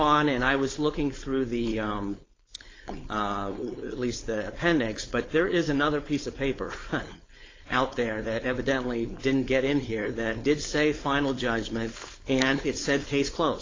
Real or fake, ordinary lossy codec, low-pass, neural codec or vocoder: fake; AAC, 32 kbps; 7.2 kHz; codec, 16 kHz, 4.8 kbps, FACodec